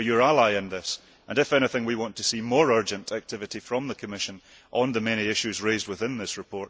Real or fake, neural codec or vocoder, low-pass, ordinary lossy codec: real; none; none; none